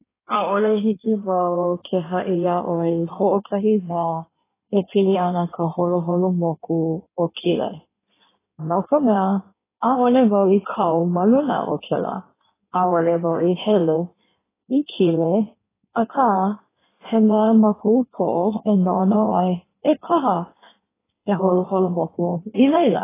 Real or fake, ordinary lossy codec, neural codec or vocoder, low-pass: fake; AAC, 16 kbps; codec, 16 kHz in and 24 kHz out, 1.1 kbps, FireRedTTS-2 codec; 3.6 kHz